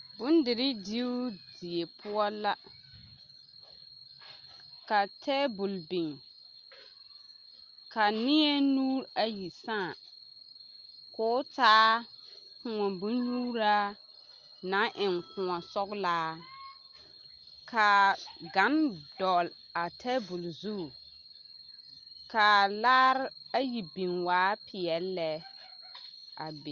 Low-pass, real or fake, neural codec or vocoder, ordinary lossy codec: 7.2 kHz; real; none; Opus, 32 kbps